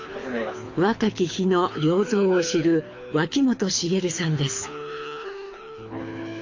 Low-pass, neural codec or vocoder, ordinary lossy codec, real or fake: 7.2 kHz; codec, 24 kHz, 6 kbps, HILCodec; AAC, 48 kbps; fake